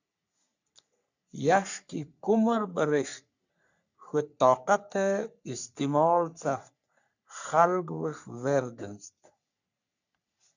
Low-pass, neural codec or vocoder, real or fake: 7.2 kHz; codec, 44.1 kHz, 3.4 kbps, Pupu-Codec; fake